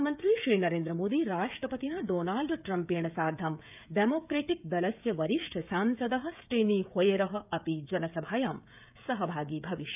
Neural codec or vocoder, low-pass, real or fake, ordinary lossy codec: codec, 16 kHz, 16 kbps, FreqCodec, smaller model; 3.6 kHz; fake; none